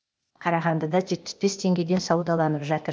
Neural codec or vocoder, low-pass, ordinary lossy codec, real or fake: codec, 16 kHz, 0.8 kbps, ZipCodec; none; none; fake